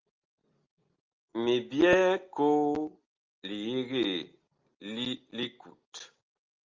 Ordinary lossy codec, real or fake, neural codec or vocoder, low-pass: Opus, 24 kbps; real; none; 7.2 kHz